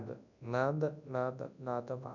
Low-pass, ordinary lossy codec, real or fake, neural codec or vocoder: 7.2 kHz; none; fake; codec, 24 kHz, 0.9 kbps, WavTokenizer, large speech release